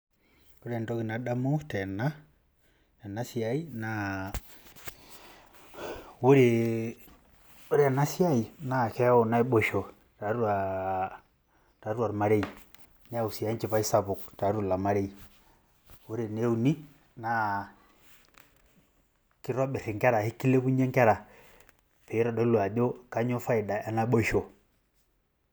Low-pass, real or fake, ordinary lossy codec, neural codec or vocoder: none; real; none; none